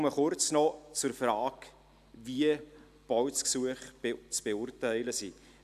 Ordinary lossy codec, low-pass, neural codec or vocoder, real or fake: none; 14.4 kHz; none; real